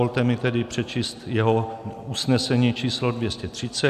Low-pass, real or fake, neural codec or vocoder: 14.4 kHz; real; none